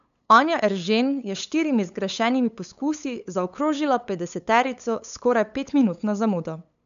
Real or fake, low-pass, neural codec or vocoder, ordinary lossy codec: fake; 7.2 kHz; codec, 16 kHz, 8 kbps, FunCodec, trained on LibriTTS, 25 frames a second; none